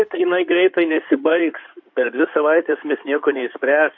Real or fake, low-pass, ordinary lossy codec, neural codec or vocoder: fake; 7.2 kHz; AAC, 48 kbps; codec, 16 kHz in and 24 kHz out, 2.2 kbps, FireRedTTS-2 codec